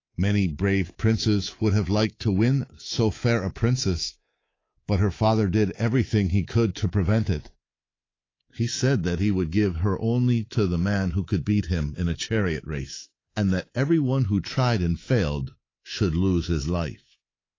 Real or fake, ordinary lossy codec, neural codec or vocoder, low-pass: fake; AAC, 32 kbps; codec, 24 kHz, 3.1 kbps, DualCodec; 7.2 kHz